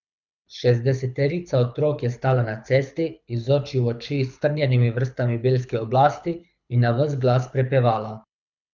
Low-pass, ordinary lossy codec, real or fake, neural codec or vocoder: 7.2 kHz; none; fake; codec, 24 kHz, 6 kbps, HILCodec